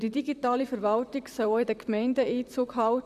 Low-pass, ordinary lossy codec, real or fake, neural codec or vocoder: 14.4 kHz; none; fake; vocoder, 44.1 kHz, 128 mel bands, Pupu-Vocoder